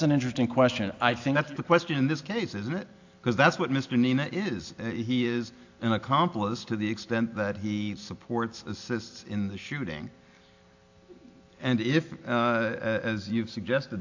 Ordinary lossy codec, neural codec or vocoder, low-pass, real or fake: AAC, 48 kbps; none; 7.2 kHz; real